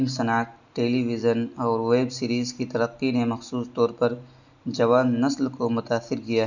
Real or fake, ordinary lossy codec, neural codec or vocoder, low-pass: real; none; none; 7.2 kHz